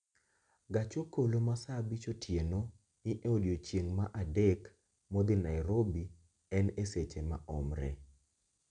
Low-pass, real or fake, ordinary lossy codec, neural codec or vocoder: 9.9 kHz; real; none; none